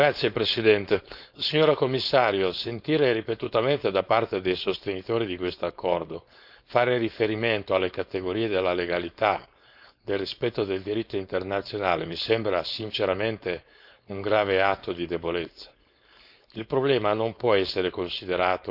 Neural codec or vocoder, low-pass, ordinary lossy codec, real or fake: codec, 16 kHz, 4.8 kbps, FACodec; 5.4 kHz; none; fake